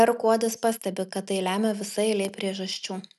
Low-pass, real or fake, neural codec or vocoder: 14.4 kHz; real; none